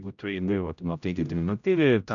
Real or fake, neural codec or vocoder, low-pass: fake; codec, 16 kHz, 0.5 kbps, X-Codec, HuBERT features, trained on general audio; 7.2 kHz